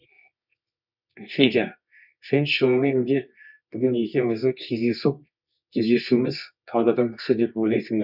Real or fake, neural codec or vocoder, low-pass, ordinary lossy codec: fake; codec, 24 kHz, 0.9 kbps, WavTokenizer, medium music audio release; 5.4 kHz; none